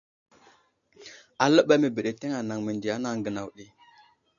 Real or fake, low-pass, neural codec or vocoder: real; 7.2 kHz; none